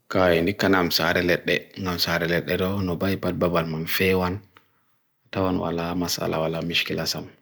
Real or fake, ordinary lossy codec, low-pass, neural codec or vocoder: real; none; none; none